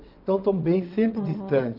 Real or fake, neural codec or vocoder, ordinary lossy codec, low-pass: fake; vocoder, 22.05 kHz, 80 mel bands, WaveNeXt; none; 5.4 kHz